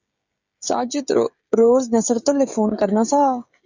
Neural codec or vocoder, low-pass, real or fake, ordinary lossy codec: codec, 16 kHz, 16 kbps, FreqCodec, smaller model; 7.2 kHz; fake; Opus, 64 kbps